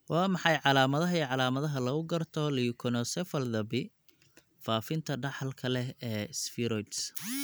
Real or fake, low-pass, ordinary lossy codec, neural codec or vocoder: real; none; none; none